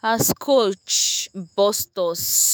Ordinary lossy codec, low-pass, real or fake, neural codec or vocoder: none; none; fake; autoencoder, 48 kHz, 128 numbers a frame, DAC-VAE, trained on Japanese speech